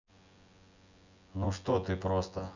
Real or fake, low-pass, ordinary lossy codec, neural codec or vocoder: fake; 7.2 kHz; none; vocoder, 24 kHz, 100 mel bands, Vocos